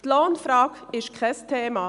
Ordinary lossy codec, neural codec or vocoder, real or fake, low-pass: MP3, 96 kbps; none; real; 10.8 kHz